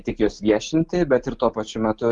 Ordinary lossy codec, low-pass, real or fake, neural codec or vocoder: Opus, 32 kbps; 9.9 kHz; real; none